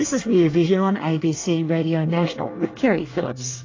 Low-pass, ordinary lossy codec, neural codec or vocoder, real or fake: 7.2 kHz; AAC, 32 kbps; codec, 24 kHz, 1 kbps, SNAC; fake